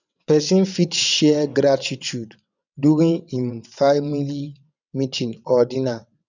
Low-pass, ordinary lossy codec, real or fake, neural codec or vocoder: 7.2 kHz; none; fake; vocoder, 22.05 kHz, 80 mel bands, WaveNeXt